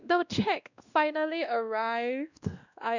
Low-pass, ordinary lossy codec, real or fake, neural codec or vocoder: 7.2 kHz; none; fake; codec, 16 kHz, 1 kbps, X-Codec, WavLM features, trained on Multilingual LibriSpeech